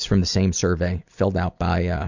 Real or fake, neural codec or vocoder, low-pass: real; none; 7.2 kHz